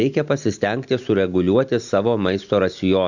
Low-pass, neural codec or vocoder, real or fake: 7.2 kHz; none; real